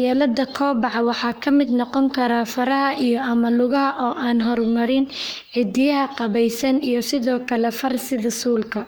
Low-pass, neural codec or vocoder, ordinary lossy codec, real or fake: none; codec, 44.1 kHz, 3.4 kbps, Pupu-Codec; none; fake